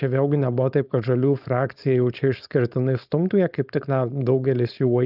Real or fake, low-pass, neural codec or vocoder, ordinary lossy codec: fake; 5.4 kHz; codec, 16 kHz, 4.8 kbps, FACodec; Opus, 24 kbps